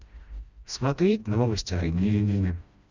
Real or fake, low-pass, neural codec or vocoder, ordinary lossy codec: fake; 7.2 kHz; codec, 16 kHz, 1 kbps, FreqCodec, smaller model; Opus, 64 kbps